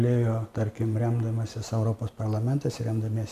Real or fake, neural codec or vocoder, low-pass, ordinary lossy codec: real; none; 14.4 kHz; AAC, 96 kbps